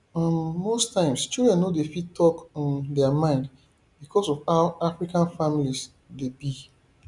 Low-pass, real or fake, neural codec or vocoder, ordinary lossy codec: 10.8 kHz; real; none; none